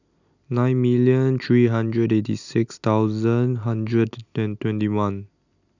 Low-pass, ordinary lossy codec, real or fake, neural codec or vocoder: 7.2 kHz; none; real; none